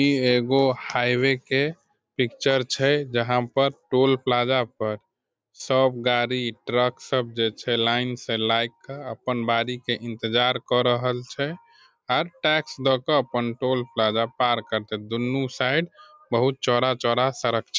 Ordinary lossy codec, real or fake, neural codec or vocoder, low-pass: none; real; none; none